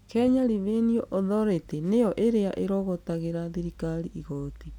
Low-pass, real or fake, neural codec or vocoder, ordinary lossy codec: 19.8 kHz; real; none; none